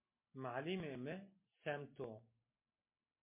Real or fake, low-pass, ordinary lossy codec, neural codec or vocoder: real; 3.6 kHz; MP3, 24 kbps; none